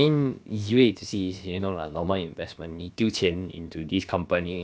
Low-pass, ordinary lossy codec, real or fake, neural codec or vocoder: none; none; fake; codec, 16 kHz, about 1 kbps, DyCAST, with the encoder's durations